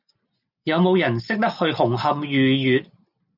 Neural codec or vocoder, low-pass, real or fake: none; 5.4 kHz; real